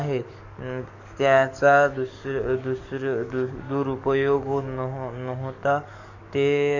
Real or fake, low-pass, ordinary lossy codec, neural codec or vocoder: fake; 7.2 kHz; none; codec, 44.1 kHz, 7.8 kbps, DAC